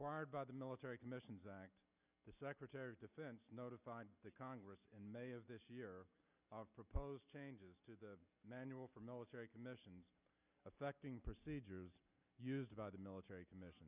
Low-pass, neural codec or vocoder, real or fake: 3.6 kHz; none; real